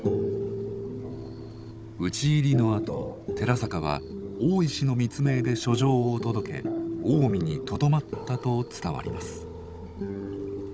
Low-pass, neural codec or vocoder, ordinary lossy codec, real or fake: none; codec, 16 kHz, 16 kbps, FunCodec, trained on Chinese and English, 50 frames a second; none; fake